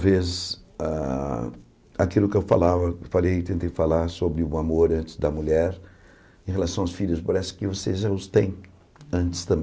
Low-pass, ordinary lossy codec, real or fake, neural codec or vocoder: none; none; real; none